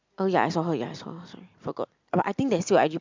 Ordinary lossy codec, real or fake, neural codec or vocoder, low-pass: MP3, 64 kbps; real; none; 7.2 kHz